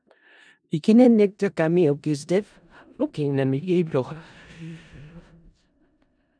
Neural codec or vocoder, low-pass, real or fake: codec, 16 kHz in and 24 kHz out, 0.4 kbps, LongCat-Audio-Codec, four codebook decoder; 9.9 kHz; fake